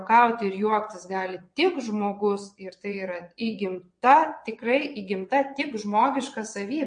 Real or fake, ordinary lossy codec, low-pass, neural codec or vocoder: fake; MP3, 48 kbps; 9.9 kHz; vocoder, 22.05 kHz, 80 mel bands, WaveNeXt